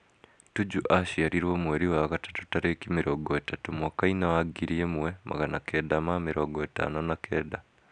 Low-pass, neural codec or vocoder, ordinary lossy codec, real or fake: 9.9 kHz; none; none; real